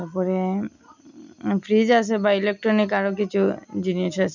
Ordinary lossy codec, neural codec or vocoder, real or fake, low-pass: none; none; real; 7.2 kHz